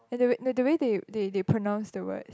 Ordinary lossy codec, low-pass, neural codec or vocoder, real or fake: none; none; none; real